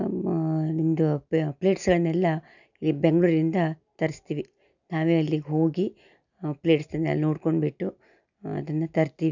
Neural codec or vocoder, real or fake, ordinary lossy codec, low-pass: none; real; none; 7.2 kHz